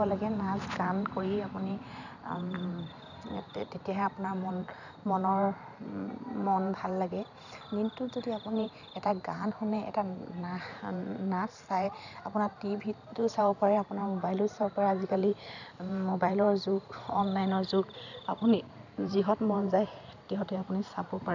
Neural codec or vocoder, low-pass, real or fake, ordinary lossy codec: vocoder, 44.1 kHz, 128 mel bands every 512 samples, BigVGAN v2; 7.2 kHz; fake; none